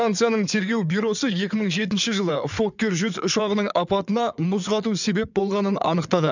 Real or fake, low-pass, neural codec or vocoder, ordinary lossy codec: fake; 7.2 kHz; codec, 16 kHz in and 24 kHz out, 2.2 kbps, FireRedTTS-2 codec; none